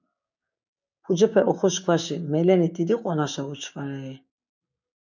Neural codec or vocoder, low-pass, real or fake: codec, 16 kHz, 6 kbps, DAC; 7.2 kHz; fake